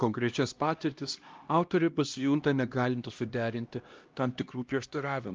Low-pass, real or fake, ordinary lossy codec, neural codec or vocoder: 7.2 kHz; fake; Opus, 24 kbps; codec, 16 kHz, 1 kbps, X-Codec, HuBERT features, trained on LibriSpeech